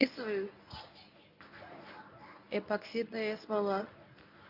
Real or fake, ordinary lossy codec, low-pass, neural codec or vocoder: fake; none; 5.4 kHz; codec, 24 kHz, 0.9 kbps, WavTokenizer, medium speech release version 1